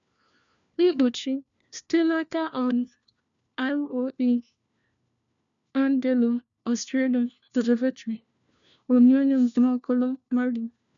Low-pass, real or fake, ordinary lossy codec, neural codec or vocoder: 7.2 kHz; fake; none; codec, 16 kHz, 1 kbps, FunCodec, trained on LibriTTS, 50 frames a second